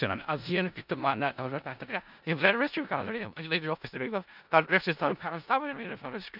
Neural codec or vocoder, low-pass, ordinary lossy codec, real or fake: codec, 16 kHz in and 24 kHz out, 0.4 kbps, LongCat-Audio-Codec, four codebook decoder; 5.4 kHz; none; fake